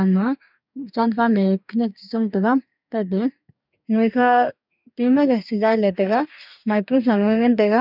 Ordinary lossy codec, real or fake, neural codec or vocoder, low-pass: none; fake; codec, 44.1 kHz, 2.6 kbps, DAC; 5.4 kHz